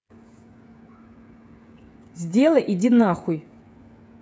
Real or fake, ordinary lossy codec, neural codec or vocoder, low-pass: fake; none; codec, 16 kHz, 16 kbps, FreqCodec, smaller model; none